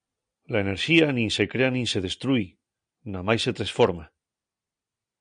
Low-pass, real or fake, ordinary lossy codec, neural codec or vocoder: 9.9 kHz; real; MP3, 96 kbps; none